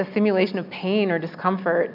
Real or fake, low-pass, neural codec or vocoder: real; 5.4 kHz; none